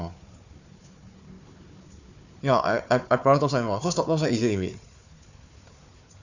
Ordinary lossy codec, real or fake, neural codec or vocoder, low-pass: none; fake; codec, 16 kHz, 4 kbps, FunCodec, trained on Chinese and English, 50 frames a second; 7.2 kHz